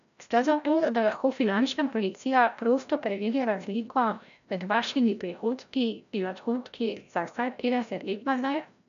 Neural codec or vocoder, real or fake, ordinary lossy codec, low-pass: codec, 16 kHz, 0.5 kbps, FreqCodec, larger model; fake; none; 7.2 kHz